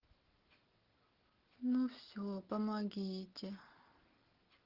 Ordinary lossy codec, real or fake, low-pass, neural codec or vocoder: Opus, 16 kbps; real; 5.4 kHz; none